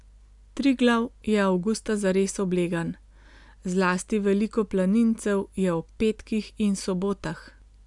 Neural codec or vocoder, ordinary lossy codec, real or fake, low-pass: none; none; real; 10.8 kHz